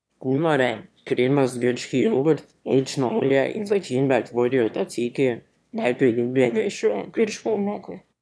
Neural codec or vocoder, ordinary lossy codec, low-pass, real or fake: autoencoder, 22.05 kHz, a latent of 192 numbers a frame, VITS, trained on one speaker; none; none; fake